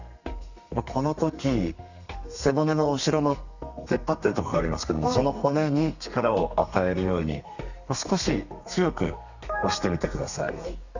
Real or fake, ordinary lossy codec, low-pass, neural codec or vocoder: fake; none; 7.2 kHz; codec, 32 kHz, 1.9 kbps, SNAC